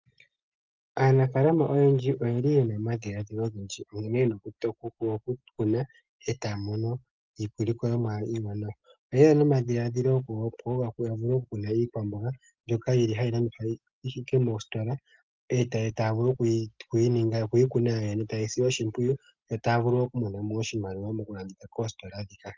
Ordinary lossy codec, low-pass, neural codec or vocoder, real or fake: Opus, 24 kbps; 7.2 kHz; none; real